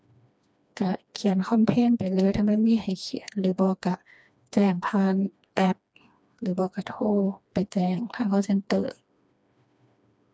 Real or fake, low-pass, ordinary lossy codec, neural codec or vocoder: fake; none; none; codec, 16 kHz, 2 kbps, FreqCodec, smaller model